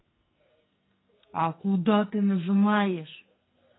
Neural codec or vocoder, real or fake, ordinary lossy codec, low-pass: codec, 44.1 kHz, 2.6 kbps, SNAC; fake; AAC, 16 kbps; 7.2 kHz